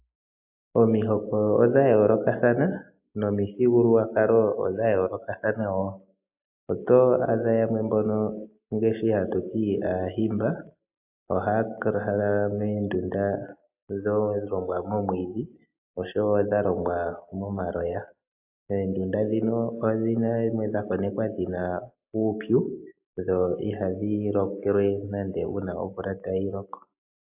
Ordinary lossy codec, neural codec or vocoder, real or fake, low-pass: AAC, 32 kbps; none; real; 3.6 kHz